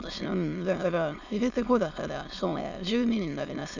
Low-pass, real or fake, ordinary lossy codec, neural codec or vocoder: 7.2 kHz; fake; none; autoencoder, 22.05 kHz, a latent of 192 numbers a frame, VITS, trained on many speakers